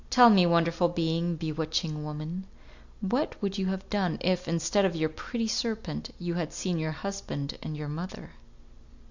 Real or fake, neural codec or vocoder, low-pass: real; none; 7.2 kHz